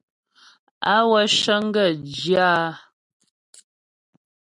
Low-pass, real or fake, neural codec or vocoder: 10.8 kHz; real; none